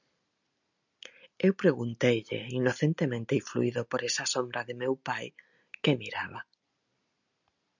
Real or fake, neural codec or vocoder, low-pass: real; none; 7.2 kHz